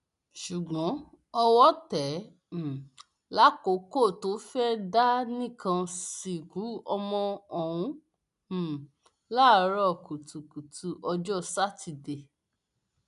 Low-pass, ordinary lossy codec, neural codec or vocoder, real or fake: 10.8 kHz; none; none; real